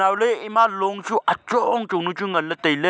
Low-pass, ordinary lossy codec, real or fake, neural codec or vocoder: none; none; real; none